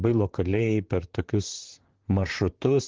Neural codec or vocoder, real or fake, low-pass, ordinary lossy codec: vocoder, 44.1 kHz, 128 mel bands, Pupu-Vocoder; fake; 7.2 kHz; Opus, 16 kbps